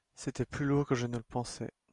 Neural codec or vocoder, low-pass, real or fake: none; 10.8 kHz; real